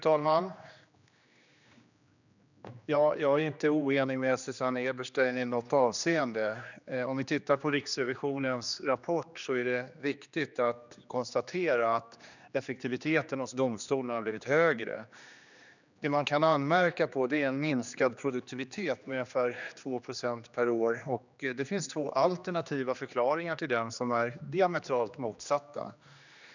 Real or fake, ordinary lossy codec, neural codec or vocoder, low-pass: fake; none; codec, 16 kHz, 2 kbps, X-Codec, HuBERT features, trained on general audio; 7.2 kHz